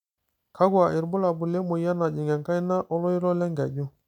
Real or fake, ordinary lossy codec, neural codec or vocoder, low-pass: real; none; none; 19.8 kHz